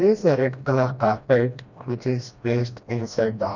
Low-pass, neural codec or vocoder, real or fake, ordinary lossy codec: 7.2 kHz; codec, 16 kHz, 1 kbps, FreqCodec, smaller model; fake; none